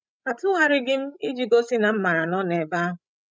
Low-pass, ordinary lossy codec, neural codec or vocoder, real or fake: none; none; codec, 16 kHz, 16 kbps, FreqCodec, larger model; fake